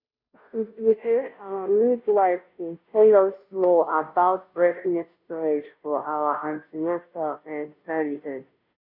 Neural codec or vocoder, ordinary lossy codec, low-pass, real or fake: codec, 16 kHz, 0.5 kbps, FunCodec, trained on Chinese and English, 25 frames a second; none; 5.4 kHz; fake